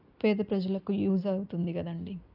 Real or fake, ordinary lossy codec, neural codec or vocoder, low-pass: real; none; none; 5.4 kHz